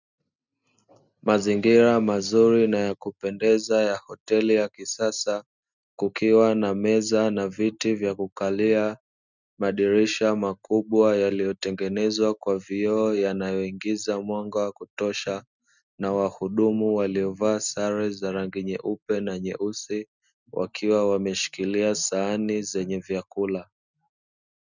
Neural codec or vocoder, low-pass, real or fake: none; 7.2 kHz; real